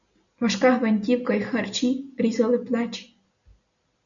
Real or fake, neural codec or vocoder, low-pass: real; none; 7.2 kHz